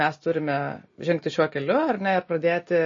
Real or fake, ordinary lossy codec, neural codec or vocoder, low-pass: real; MP3, 32 kbps; none; 7.2 kHz